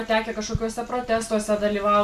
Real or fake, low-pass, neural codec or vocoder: real; 14.4 kHz; none